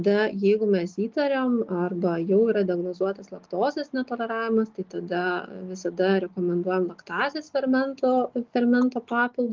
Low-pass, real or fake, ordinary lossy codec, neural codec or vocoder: 7.2 kHz; real; Opus, 24 kbps; none